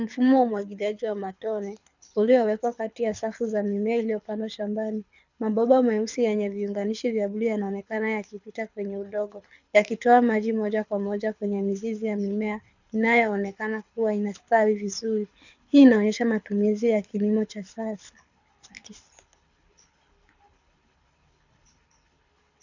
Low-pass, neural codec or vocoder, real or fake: 7.2 kHz; codec, 24 kHz, 6 kbps, HILCodec; fake